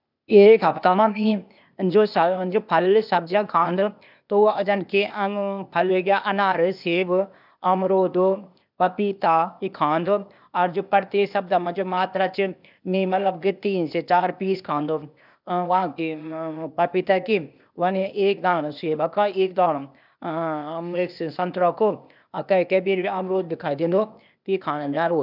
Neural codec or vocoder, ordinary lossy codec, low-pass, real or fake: codec, 16 kHz, 0.8 kbps, ZipCodec; none; 5.4 kHz; fake